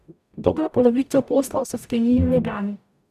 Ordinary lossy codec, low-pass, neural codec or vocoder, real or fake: none; 14.4 kHz; codec, 44.1 kHz, 0.9 kbps, DAC; fake